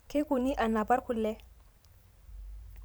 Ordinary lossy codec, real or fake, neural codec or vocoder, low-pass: none; fake; vocoder, 44.1 kHz, 128 mel bands every 512 samples, BigVGAN v2; none